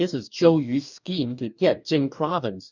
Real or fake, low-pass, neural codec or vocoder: fake; 7.2 kHz; codec, 44.1 kHz, 2.6 kbps, DAC